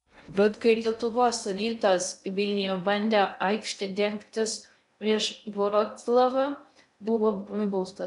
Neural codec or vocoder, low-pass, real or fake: codec, 16 kHz in and 24 kHz out, 0.6 kbps, FocalCodec, streaming, 2048 codes; 10.8 kHz; fake